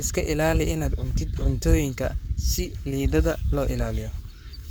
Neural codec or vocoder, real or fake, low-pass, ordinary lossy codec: codec, 44.1 kHz, 7.8 kbps, Pupu-Codec; fake; none; none